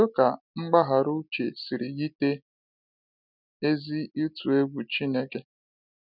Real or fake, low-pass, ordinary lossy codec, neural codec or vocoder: real; 5.4 kHz; none; none